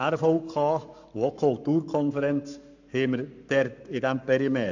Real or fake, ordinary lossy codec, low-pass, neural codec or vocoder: fake; none; 7.2 kHz; codec, 44.1 kHz, 7.8 kbps, Pupu-Codec